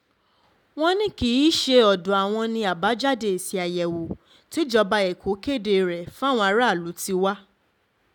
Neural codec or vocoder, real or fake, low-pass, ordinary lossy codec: none; real; 19.8 kHz; none